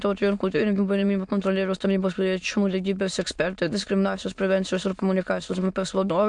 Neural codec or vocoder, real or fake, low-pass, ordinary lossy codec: autoencoder, 22.05 kHz, a latent of 192 numbers a frame, VITS, trained on many speakers; fake; 9.9 kHz; MP3, 64 kbps